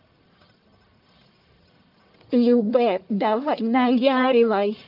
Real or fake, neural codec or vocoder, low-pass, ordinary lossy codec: fake; codec, 44.1 kHz, 1.7 kbps, Pupu-Codec; 5.4 kHz; Opus, 24 kbps